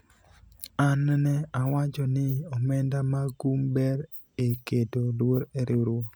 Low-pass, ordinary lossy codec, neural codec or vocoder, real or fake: none; none; none; real